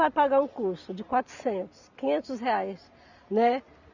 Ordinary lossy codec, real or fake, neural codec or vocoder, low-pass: none; real; none; 7.2 kHz